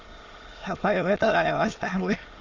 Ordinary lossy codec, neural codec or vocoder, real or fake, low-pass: Opus, 32 kbps; autoencoder, 22.05 kHz, a latent of 192 numbers a frame, VITS, trained on many speakers; fake; 7.2 kHz